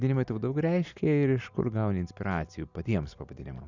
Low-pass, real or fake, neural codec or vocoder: 7.2 kHz; real; none